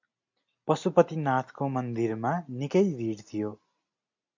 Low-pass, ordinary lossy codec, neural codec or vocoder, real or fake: 7.2 kHz; MP3, 64 kbps; none; real